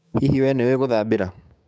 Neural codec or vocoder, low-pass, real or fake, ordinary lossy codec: codec, 16 kHz, 6 kbps, DAC; none; fake; none